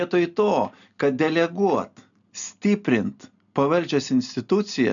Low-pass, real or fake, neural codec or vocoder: 7.2 kHz; real; none